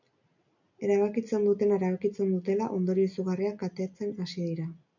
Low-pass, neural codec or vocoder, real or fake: 7.2 kHz; none; real